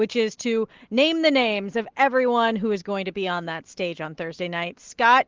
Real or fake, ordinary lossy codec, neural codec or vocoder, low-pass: real; Opus, 16 kbps; none; 7.2 kHz